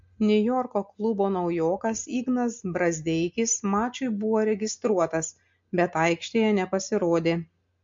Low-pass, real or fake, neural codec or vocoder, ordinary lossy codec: 7.2 kHz; real; none; MP3, 48 kbps